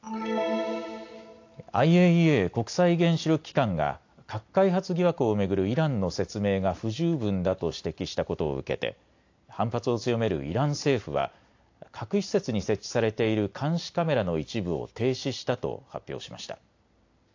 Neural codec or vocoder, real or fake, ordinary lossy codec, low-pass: none; real; AAC, 48 kbps; 7.2 kHz